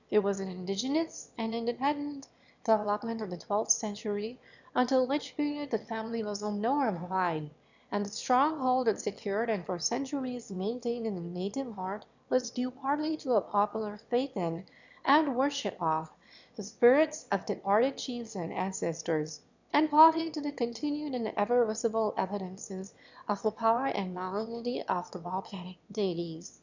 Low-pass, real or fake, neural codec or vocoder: 7.2 kHz; fake; autoencoder, 22.05 kHz, a latent of 192 numbers a frame, VITS, trained on one speaker